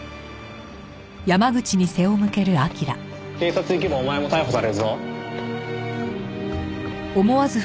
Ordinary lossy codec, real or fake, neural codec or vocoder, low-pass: none; real; none; none